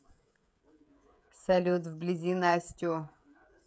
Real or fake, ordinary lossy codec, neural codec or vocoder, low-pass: fake; none; codec, 16 kHz, 16 kbps, FreqCodec, smaller model; none